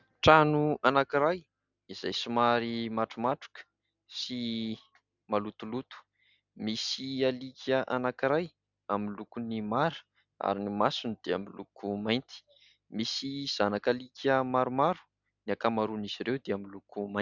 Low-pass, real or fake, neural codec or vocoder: 7.2 kHz; real; none